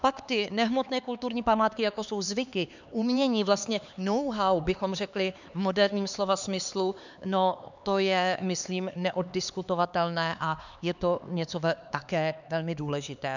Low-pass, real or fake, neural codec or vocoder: 7.2 kHz; fake; codec, 16 kHz, 4 kbps, X-Codec, HuBERT features, trained on LibriSpeech